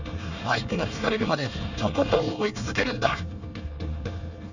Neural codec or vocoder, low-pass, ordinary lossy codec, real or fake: codec, 24 kHz, 1 kbps, SNAC; 7.2 kHz; none; fake